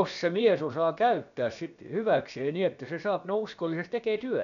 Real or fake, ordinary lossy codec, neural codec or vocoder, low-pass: fake; none; codec, 16 kHz, about 1 kbps, DyCAST, with the encoder's durations; 7.2 kHz